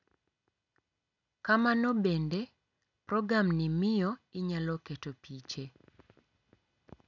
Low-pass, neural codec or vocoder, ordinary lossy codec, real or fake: 7.2 kHz; none; none; real